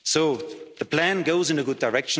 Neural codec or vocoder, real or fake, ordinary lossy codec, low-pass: none; real; none; none